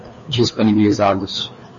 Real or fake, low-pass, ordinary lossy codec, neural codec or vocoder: fake; 7.2 kHz; MP3, 32 kbps; codec, 16 kHz, 2 kbps, FreqCodec, larger model